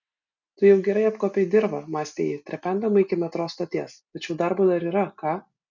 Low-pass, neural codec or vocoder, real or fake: 7.2 kHz; none; real